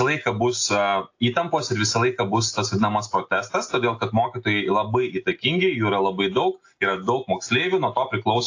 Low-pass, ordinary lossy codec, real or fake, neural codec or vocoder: 7.2 kHz; AAC, 48 kbps; real; none